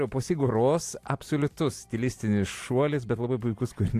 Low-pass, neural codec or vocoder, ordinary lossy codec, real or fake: 14.4 kHz; none; AAC, 64 kbps; real